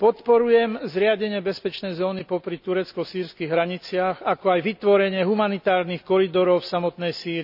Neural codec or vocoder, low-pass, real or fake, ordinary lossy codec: none; 5.4 kHz; real; none